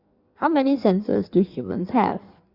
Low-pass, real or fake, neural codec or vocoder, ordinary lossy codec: 5.4 kHz; fake; codec, 16 kHz in and 24 kHz out, 1.1 kbps, FireRedTTS-2 codec; Opus, 64 kbps